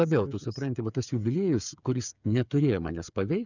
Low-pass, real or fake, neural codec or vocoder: 7.2 kHz; fake; codec, 16 kHz, 8 kbps, FreqCodec, smaller model